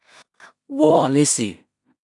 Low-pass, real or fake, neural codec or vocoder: 10.8 kHz; fake; codec, 16 kHz in and 24 kHz out, 0.4 kbps, LongCat-Audio-Codec, two codebook decoder